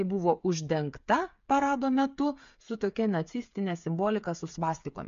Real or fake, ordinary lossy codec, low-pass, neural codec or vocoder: fake; MP3, 64 kbps; 7.2 kHz; codec, 16 kHz, 8 kbps, FreqCodec, smaller model